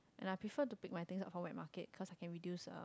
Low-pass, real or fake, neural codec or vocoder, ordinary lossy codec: none; real; none; none